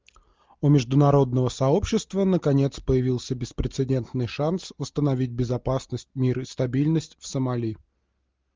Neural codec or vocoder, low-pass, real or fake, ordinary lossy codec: none; 7.2 kHz; real; Opus, 24 kbps